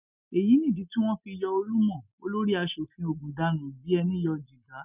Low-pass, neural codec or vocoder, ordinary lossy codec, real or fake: 3.6 kHz; none; none; real